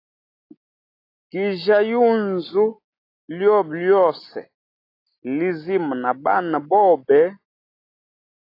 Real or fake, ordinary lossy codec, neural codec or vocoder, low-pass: real; AAC, 32 kbps; none; 5.4 kHz